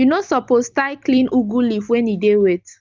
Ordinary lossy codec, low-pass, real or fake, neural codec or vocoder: Opus, 32 kbps; 7.2 kHz; real; none